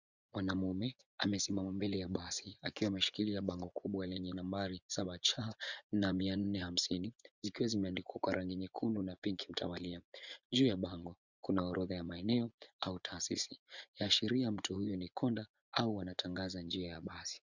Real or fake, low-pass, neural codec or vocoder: fake; 7.2 kHz; vocoder, 24 kHz, 100 mel bands, Vocos